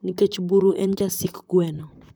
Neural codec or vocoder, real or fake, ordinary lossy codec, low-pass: vocoder, 44.1 kHz, 128 mel bands, Pupu-Vocoder; fake; none; none